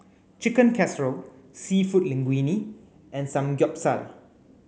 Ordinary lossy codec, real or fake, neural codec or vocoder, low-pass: none; real; none; none